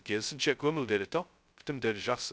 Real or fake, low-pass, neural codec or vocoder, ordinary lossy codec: fake; none; codec, 16 kHz, 0.2 kbps, FocalCodec; none